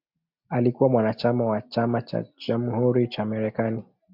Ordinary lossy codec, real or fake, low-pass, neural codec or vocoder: MP3, 48 kbps; real; 5.4 kHz; none